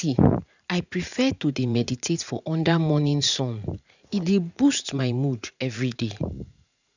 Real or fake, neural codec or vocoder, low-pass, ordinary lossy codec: fake; vocoder, 44.1 kHz, 80 mel bands, Vocos; 7.2 kHz; none